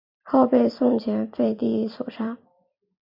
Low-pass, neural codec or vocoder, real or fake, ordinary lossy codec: 5.4 kHz; vocoder, 44.1 kHz, 128 mel bands every 256 samples, BigVGAN v2; fake; MP3, 48 kbps